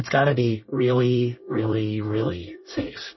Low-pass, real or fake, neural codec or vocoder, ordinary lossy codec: 7.2 kHz; fake; codec, 24 kHz, 1 kbps, SNAC; MP3, 24 kbps